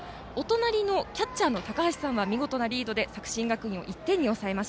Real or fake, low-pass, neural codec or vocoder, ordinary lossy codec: real; none; none; none